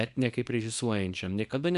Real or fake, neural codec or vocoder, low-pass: fake; codec, 24 kHz, 0.9 kbps, WavTokenizer, small release; 10.8 kHz